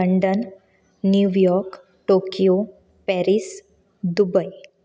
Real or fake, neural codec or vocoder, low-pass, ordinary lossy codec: real; none; none; none